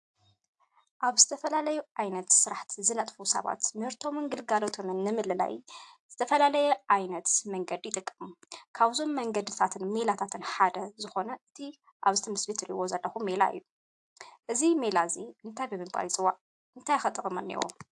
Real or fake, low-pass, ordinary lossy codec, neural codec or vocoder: real; 10.8 kHz; AAC, 64 kbps; none